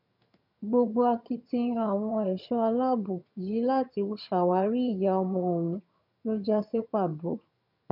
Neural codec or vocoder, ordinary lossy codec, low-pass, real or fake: vocoder, 22.05 kHz, 80 mel bands, HiFi-GAN; none; 5.4 kHz; fake